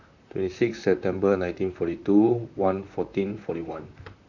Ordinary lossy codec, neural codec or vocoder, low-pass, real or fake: none; vocoder, 44.1 kHz, 128 mel bands, Pupu-Vocoder; 7.2 kHz; fake